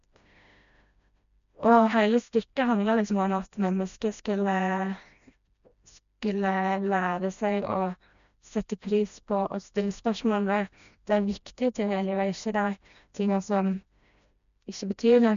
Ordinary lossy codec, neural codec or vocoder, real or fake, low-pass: none; codec, 16 kHz, 1 kbps, FreqCodec, smaller model; fake; 7.2 kHz